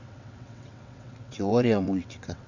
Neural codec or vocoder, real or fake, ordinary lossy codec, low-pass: vocoder, 22.05 kHz, 80 mel bands, WaveNeXt; fake; none; 7.2 kHz